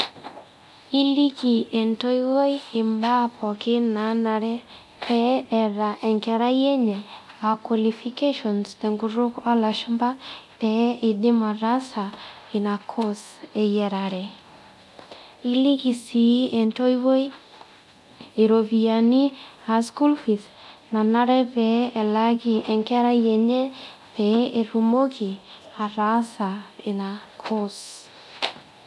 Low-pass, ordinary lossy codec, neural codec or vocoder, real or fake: none; none; codec, 24 kHz, 0.9 kbps, DualCodec; fake